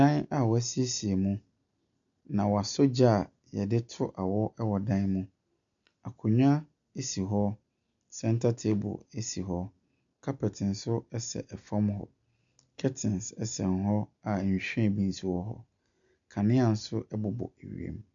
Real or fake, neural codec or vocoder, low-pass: real; none; 7.2 kHz